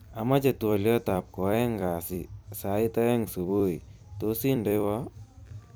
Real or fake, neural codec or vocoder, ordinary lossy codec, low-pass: fake; vocoder, 44.1 kHz, 128 mel bands every 256 samples, BigVGAN v2; none; none